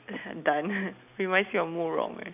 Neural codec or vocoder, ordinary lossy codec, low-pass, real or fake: none; none; 3.6 kHz; real